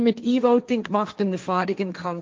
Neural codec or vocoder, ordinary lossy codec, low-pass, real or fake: codec, 16 kHz, 1.1 kbps, Voila-Tokenizer; Opus, 24 kbps; 7.2 kHz; fake